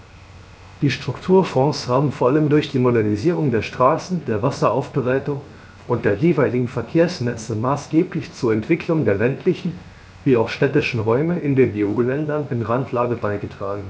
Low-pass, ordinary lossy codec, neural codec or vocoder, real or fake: none; none; codec, 16 kHz, 0.7 kbps, FocalCodec; fake